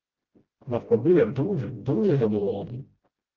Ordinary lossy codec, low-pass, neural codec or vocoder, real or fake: Opus, 16 kbps; 7.2 kHz; codec, 16 kHz, 0.5 kbps, FreqCodec, smaller model; fake